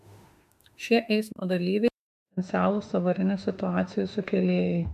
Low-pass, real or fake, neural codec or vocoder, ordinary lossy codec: 14.4 kHz; fake; autoencoder, 48 kHz, 32 numbers a frame, DAC-VAE, trained on Japanese speech; MP3, 96 kbps